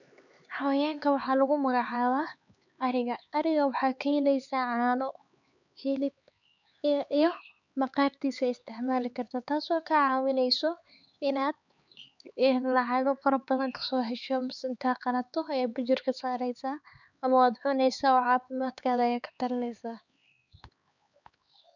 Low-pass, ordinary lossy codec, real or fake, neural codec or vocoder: 7.2 kHz; none; fake; codec, 16 kHz, 4 kbps, X-Codec, HuBERT features, trained on LibriSpeech